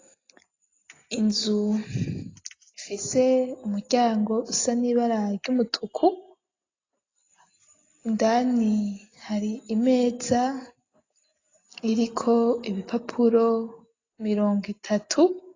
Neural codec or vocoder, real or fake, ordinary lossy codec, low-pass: none; real; AAC, 32 kbps; 7.2 kHz